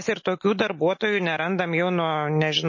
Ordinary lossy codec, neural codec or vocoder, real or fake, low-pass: MP3, 32 kbps; none; real; 7.2 kHz